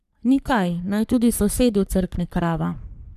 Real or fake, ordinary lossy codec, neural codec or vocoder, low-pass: fake; none; codec, 44.1 kHz, 3.4 kbps, Pupu-Codec; 14.4 kHz